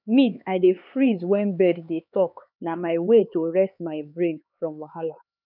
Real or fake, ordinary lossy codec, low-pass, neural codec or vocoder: fake; none; 5.4 kHz; codec, 16 kHz, 4 kbps, X-Codec, HuBERT features, trained on LibriSpeech